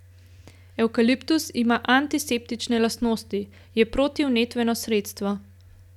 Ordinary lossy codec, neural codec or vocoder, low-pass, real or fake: none; none; 19.8 kHz; real